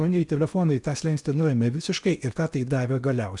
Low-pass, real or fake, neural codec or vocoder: 10.8 kHz; fake; codec, 16 kHz in and 24 kHz out, 0.8 kbps, FocalCodec, streaming, 65536 codes